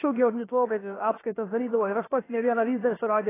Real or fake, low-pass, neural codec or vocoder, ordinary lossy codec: fake; 3.6 kHz; codec, 16 kHz, about 1 kbps, DyCAST, with the encoder's durations; AAC, 16 kbps